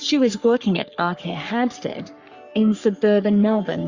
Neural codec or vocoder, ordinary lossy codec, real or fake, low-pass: codec, 44.1 kHz, 3.4 kbps, Pupu-Codec; Opus, 64 kbps; fake; 7.2 kHz